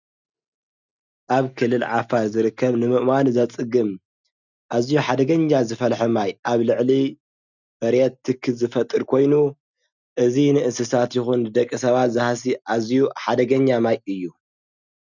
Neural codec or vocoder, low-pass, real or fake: none; 7.2 kHz; real